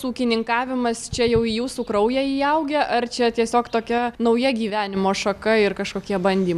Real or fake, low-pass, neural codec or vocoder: real; 14.4 kHz; none